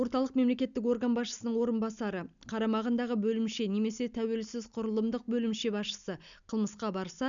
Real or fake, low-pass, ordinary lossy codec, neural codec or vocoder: real; 7.2 kHz; none; none